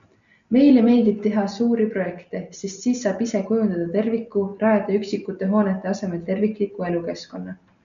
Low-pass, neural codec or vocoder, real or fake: 7.2 kHz; none; real